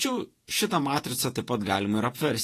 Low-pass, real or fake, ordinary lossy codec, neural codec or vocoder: 14.4 kHz; fake; AAC, 48 kbps; vocoder, 48 kHz, 128 mel bands, Vocos